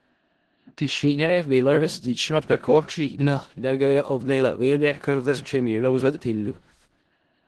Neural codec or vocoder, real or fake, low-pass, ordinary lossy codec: codec, 16 kHz in and 24 kHz out, 0.4 kbps, LongCat-Audio-Codec, four codebook decoder; fake; 10.8 kHz; Opus, 16 kbps